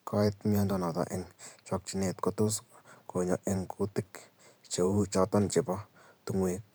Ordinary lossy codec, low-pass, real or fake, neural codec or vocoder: none; none; fake; vocoder, 44.1 kHz, 128 mel bands every 512 samples, BigVGAN v2